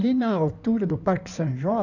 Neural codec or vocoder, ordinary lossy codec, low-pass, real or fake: codec, 16 kHz in and 24 kHz out, 2.2 kbps, FireRedTTS-2 codec; none; 7.2 kHz; fake